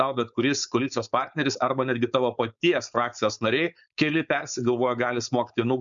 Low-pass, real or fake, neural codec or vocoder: 7.2 kHz; fake; codec, 16 kHz, 4.8 kbps, FACodec